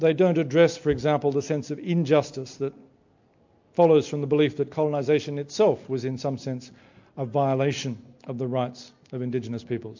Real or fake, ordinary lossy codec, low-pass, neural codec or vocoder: real; MP3, 48 kbps; 7.2 kHz; none